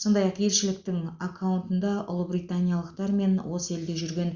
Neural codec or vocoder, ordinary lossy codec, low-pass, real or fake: none; Opus, 64 kbps; 7.2 kHz; real